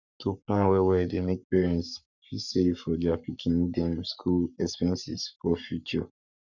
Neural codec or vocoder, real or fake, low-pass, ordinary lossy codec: codec, 44.1 kHz, 7.8 kbps, Pupu-Codec; fake; 7.2 kHz; none